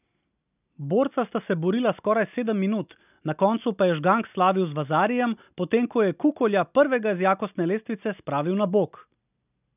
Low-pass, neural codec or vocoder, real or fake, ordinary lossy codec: 3.6 kHz; none; real; none